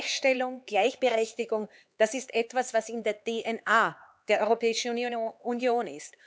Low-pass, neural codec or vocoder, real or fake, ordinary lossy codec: none; codec, 16 kHz, 4 kbps, X-Codec, HuBERT features, trained on LibriSpeech; fake; none